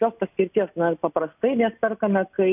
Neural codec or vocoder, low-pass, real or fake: none; 3.6 kHz; real